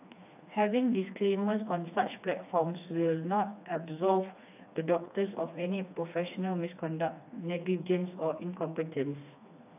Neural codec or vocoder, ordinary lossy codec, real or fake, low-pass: codec, 16 kHz, 2 kbps, FreqCodec, smaller model; AAC, 32 kbps; fake; 3.6 kHz